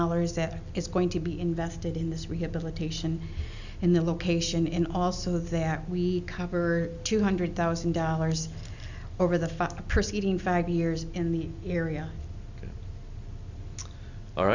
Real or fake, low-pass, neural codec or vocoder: real; 7.2 kHz; none